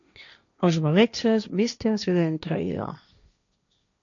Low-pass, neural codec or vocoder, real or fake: 7.2 kHz; codec, 16 kHz, 1.1 kbps, Voila-Tokenizer; fake